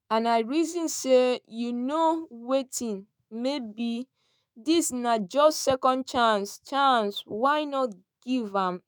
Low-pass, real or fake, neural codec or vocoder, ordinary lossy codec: none; fake; autoencoder, 48 kHz, 128 numbers a frame, DAC-VAE, trained on Japanese speech; none